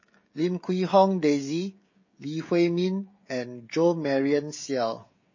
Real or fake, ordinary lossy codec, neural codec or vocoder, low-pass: fake; MP3, 32 kbps; codec, 16 kHz, 16 kbps, FreqCodec, smaller model; 7.2 kHz